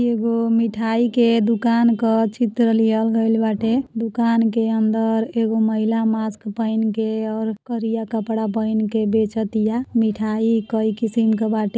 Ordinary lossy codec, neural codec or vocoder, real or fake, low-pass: none; none; real; none